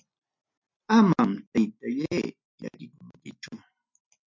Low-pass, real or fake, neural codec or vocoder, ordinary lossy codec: 7.2 kHz; real; none; MP3, 64 kbps